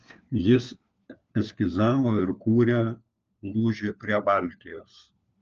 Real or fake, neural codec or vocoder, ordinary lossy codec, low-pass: fake; codec, 16 kHz, 4 kbps, FunCodec, trained on LibriTTS, 50 frames a second; Opus, 24 kbps; 7.2 kHz